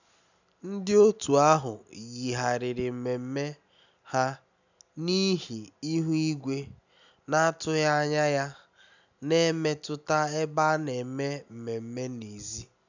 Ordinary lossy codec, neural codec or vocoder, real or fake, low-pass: none; none; real; 7.2 kHz